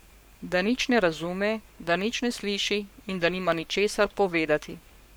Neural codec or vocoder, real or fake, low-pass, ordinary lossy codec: codec, 44.1 kHz, 7.8 kbps, Pupu-Codec; fake; none; none